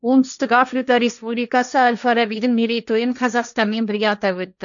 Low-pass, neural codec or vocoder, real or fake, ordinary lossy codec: 7.2 kHz; codec, 16 kHz, 1.1 kbps, Voila-Tokenizer; fake; none